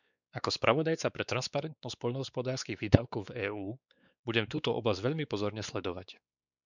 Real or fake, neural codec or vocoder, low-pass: fake; codec, 16 kHz, 4 kbps, X-Codec, WavLM features, trained on Multilingual LibriSpeech; 7.2 kHz